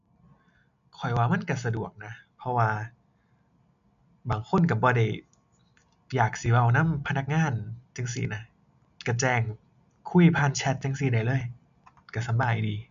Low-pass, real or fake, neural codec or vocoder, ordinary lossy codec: 7.2 kHz; real; none; none